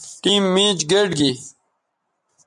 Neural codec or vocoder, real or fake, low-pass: none; real; 10.8 kHz